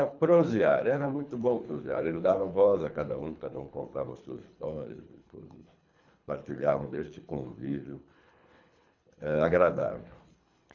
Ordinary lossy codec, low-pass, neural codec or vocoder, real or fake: none; 7.2 kHz; codec, 24 kHz, 3 kbps, HILCodec; fake